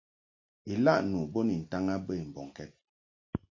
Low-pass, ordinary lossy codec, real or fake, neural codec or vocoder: 7.2 kHz; MP3, 64 kbps; real; none